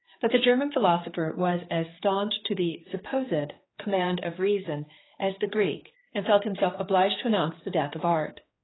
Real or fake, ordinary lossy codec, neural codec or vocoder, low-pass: fake; AAC, 16 kbps; codec, 16 kHz, 4 kbps, X-Codec, HuBERT features, trained on general audio; 7.2 kHz